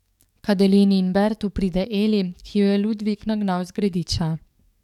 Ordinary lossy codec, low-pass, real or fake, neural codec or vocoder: none; 19.8 kHz; fake; codec, 44.1 kHz, 7.8 kbps, DAC